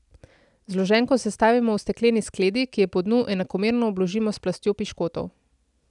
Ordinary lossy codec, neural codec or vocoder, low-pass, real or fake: none; none; 10.8 kHz; real